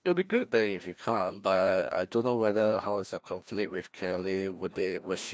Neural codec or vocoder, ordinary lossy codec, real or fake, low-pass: codec, 16 kHz, 1 kbps, FreqCodec, larger model; none; fake; none